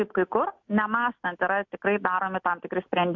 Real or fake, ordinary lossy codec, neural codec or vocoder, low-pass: real; MP3, 64 kbps; none; 7.2 kHz